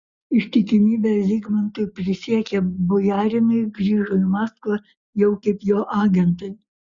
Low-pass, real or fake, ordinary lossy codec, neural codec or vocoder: 7.2 kHz; real; Opus, 64 kbps; none